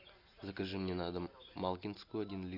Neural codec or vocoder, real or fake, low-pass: none; real; 5.4 kHz